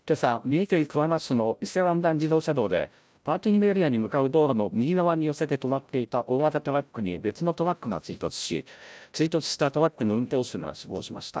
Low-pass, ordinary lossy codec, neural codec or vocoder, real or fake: none; none; codec, 16 kHz, 0.5 kbps, FreqCodec, larger model; fake